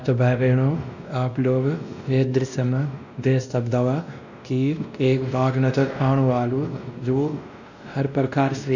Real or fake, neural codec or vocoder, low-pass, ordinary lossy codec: fake; codec, 16 kHz, 1 kbps, X-Codec, WavLM features, trained on Multilingual LibriSpeech; 7.2 kHz; none